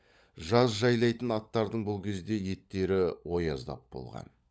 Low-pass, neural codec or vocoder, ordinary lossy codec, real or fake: none; codec, 16 kHz, 16 kbps, FunCodec, trained on LibriTTS, 50 frames a second; none; fake